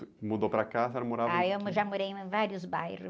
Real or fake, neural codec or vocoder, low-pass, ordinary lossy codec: real; none; none; none